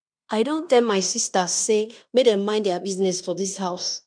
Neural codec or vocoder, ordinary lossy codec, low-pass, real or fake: codec, 16 kHz in and 24 kHz out, 0.9 kbps, LongCat-Audio-Codec, fine tuned four codebook decoder; MP3, 96 kbps; 9.9 kHz; fake